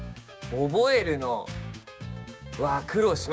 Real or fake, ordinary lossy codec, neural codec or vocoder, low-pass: fake; none; codec, 16 kHz, 6 kbps, DAC; none